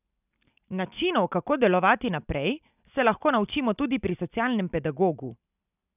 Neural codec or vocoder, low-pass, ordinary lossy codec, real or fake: none; 3.6 kHz; none; real